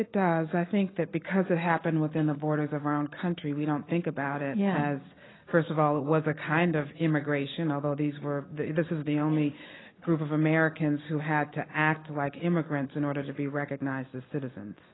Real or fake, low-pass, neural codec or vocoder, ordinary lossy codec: real; 7.2 kHz; none; AAC, 16 kbps